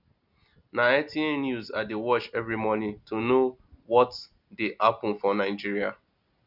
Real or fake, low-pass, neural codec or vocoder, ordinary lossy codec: real; 5.4 kHz; none; none